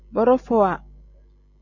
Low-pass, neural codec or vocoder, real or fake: 7.2 kHz; none; real